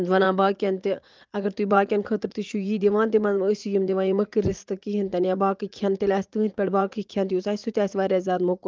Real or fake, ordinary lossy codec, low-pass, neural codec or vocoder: fake; Opus, 24 kbps; 7.2 kHz; vocoder, 44.1 kHz, 80 mel bands, Vocos